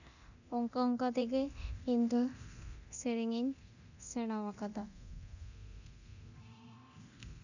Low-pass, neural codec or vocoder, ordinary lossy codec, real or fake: 7.2 kHz; codec, 24 kHz, 0.9 kbps, DualCodec; none; fake